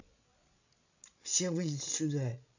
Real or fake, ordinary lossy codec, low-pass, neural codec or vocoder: fake; none; 7.2 kHz; codec, 16 kHz, 16 kbps, FreqCodec, larger model